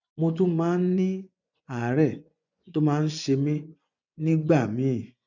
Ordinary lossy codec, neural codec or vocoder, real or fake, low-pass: none; vocoder, 24 kHz, 100 mel bands, Vocos; fake; 7.2 kHz